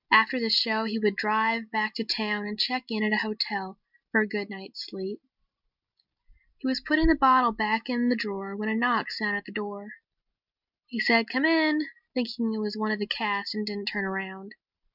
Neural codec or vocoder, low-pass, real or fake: none; 5.4 kHz; real